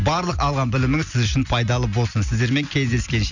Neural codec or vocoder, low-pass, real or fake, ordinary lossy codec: none; 7.2 kHz; real; none